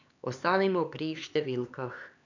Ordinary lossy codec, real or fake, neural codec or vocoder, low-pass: none; fake; codec, 16 kHz, 4 kbps, X-Codec, HuBERT features, trained on LibriSpeech; 7.2 kHz